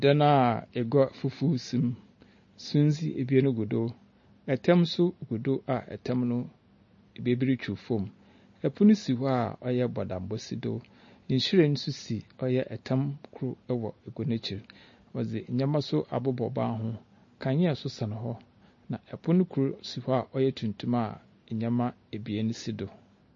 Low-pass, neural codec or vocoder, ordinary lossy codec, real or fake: 7.2 kHz; none; MP3, 32 kbps; real